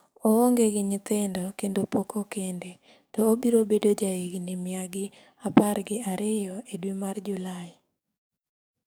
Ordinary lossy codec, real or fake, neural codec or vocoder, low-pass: none; fake; codec, 44.1 kHz, 7.8 kbps, DAC; none